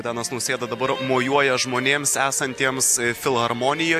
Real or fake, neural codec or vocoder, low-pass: real; none; 14.4 kHz